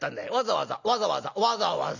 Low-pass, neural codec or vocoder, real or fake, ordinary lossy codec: 7.2 kHz; none; real; none